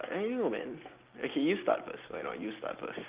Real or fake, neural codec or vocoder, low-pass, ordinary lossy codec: real; none; 3.6 kHz; Opus, 24 kbps